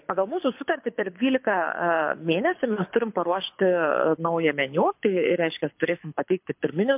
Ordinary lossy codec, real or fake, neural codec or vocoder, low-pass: MP3, 32 kbps; fake; codec, 24 kHz, 6 kbps, HILCodec; 3.6 kHz